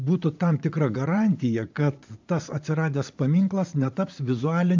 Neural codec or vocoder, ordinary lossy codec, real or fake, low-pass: vocoder, 44.1 kHz, 80 mel bands, Vocos; MP3, 64 kbps; fake; 7.2 kHz